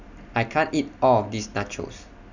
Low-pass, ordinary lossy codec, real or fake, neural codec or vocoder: 7.2 kHz; none; real; none